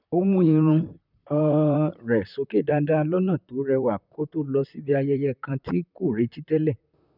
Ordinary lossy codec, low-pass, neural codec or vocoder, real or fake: none; 5.4 kHz; vocoder, 44.1 kHz, 128 mel bands, Pupu-Vocoder; fake